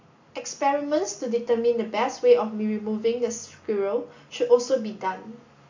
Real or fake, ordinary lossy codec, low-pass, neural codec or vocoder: real; AAC, 48 kbps; 7.2 kHz; none